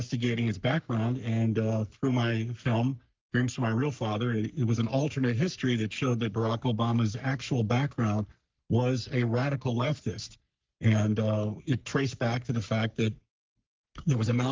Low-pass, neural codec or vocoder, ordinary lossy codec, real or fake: 7.2 kHz; codec, 44.1 kHz, 3.4 kbps, Pupu-Codec; Opus, 24 kbps; fake